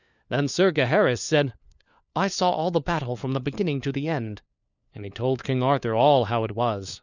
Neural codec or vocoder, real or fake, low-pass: codec, 16 kHz, 4 kbps, FunCodec, trained on LibriTTS, 50 frames a second; fake; 7.2 kHz